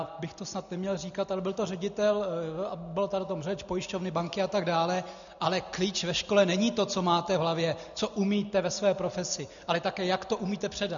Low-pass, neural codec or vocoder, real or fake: 7.2 kHz; none; real